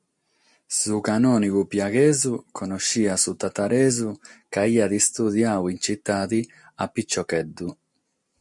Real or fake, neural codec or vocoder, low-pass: real; none; 10.8 kHz